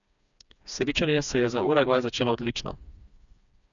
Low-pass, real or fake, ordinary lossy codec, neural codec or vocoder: 7.2 kHz; fake; none; codec, 16 kHz, 2 kbps, FreqCodec, smaller model